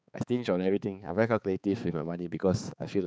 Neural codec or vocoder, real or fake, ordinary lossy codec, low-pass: codec, 16 kHz, 4 kbps, X-Codec, HuBERT features, trained on balanced general audio; fake; none; none